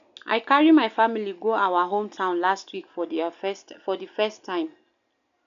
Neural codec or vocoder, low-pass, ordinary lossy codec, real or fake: none; 7.2 kHz; none; real